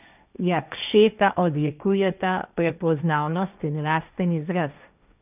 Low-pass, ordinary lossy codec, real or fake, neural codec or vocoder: 3.6 kHz; none; fake; codec, 16 kHz, 1.1 kbps, Voila-Tokenizer